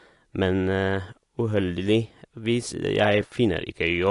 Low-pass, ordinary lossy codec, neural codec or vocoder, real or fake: 10.8 kHz; AAC, 48 kbps; none; real